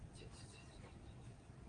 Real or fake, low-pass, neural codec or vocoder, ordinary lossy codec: fake; 9.9 kHz; vocoder, 22.05 kHz, 80 mel bands, Vocos; Opus, 32 kbps